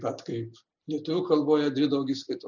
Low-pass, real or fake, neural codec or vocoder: 7.2 kHz; real; none